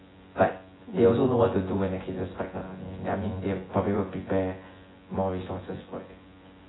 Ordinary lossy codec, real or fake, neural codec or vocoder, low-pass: AAC, 16 kbps; fake; vocoder, 24 kHz, 100 mel bands, Vocos; 7.2 kHz